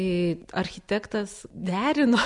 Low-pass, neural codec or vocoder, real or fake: 10.8 kHz; none; real